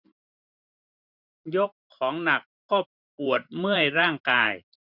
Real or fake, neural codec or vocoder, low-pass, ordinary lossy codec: fake; vocoder, 44.1 kHz, 128 mel bands every 512 samples, BigVGAN v2; 5.4 kHz; none